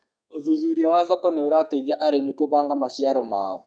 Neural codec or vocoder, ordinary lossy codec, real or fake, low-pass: codec, 32 kHz, 1.9 kbps, SNAC; none; fake; 9.9 kHz